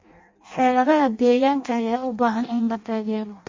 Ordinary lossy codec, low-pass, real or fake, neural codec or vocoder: MP3, 32 kbps; 7.2 kHz; fake; codec, 16 kHz in and 24 kHz out, 0.6 kbps, FireRedTTS-2 codec